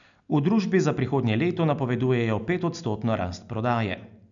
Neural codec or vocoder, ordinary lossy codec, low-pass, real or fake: none; none; 7.2 kHz; real